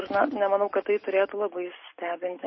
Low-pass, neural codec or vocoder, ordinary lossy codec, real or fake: 7.2 kHz; none; MP3, 24 kbps; real